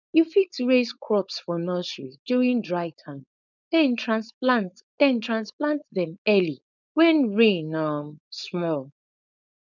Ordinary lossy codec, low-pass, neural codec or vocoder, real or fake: none; 7.2 kHz; codec, 16 kHz, 4.8 kbps, FACodec; fake